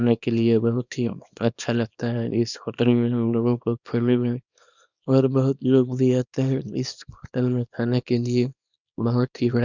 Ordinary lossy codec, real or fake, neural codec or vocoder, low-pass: none; fake; codec, 24 kHz, 0.9 kbps, WavTokenizer, small release; 7.2 kHz